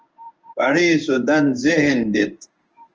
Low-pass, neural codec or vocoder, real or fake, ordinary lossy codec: 7.2 kHz; codec, 16 kHz in and 24 kHz out, 1 kbps, XY-Tokenizer; fake; Opus, 24 kbps